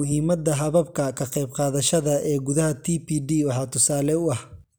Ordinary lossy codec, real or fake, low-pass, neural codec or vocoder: none; real; none; none